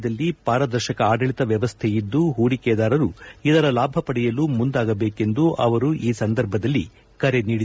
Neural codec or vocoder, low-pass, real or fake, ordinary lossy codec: none; none; real; none